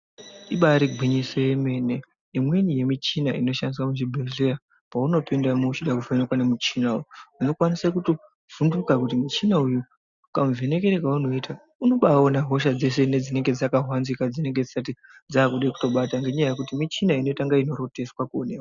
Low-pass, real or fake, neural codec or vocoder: 7.2 kHz; real; none